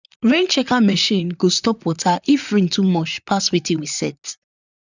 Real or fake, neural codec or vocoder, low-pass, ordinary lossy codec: fake; vocoder, 44.1 kHz, 128 mel bands, Pupu-Vocoder; 7.2 kHz; none